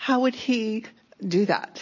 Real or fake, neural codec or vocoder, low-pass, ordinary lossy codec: real; none; 7.2 kHz; MP3, 32 kbps